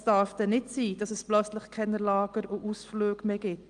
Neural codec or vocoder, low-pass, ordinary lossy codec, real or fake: none; 9.9 kHz; Opus, 24 kbps; real